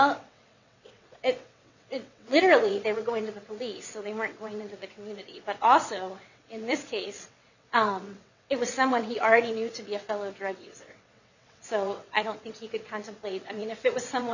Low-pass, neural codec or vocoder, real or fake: 7.2 kHz; vocoder, 22.05 kHz, 80 mel bands, WaveNeXt; fake